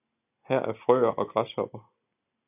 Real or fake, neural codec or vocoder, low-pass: real; none; 3.6 kHz